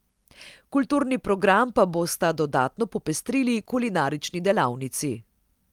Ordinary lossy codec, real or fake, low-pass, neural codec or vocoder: Opus, 32 kbps; real; 19.8 kHz; none